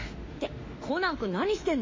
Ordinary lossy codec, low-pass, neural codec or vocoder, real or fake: MP3, 32 kbps; 7.2 kHz; autoencoder, 48 kHz, 32 numbers a frame, DAC-VAE, trained on Japanese speech; fake